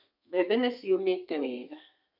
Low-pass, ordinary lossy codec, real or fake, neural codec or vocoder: 5.4 kHz; none; fake; codec, 32 kHz, 1.9 kbps, SNAC